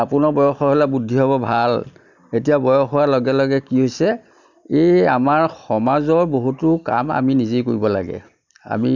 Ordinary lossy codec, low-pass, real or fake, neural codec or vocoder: none; 7.2 kHz; real; none